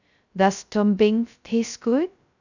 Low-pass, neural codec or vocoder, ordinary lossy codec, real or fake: 7.2 kHz; codec, 16 kHz, 0.2 kbps, FocalCodec; none; fake